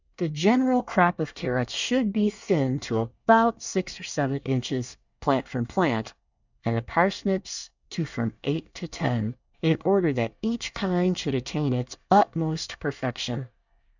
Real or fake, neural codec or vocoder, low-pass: fake; codec, 24 kHz, 1 kbps, SNAC; 7.2 kHz